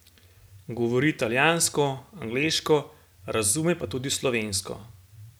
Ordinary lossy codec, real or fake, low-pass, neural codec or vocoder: none; real; none; none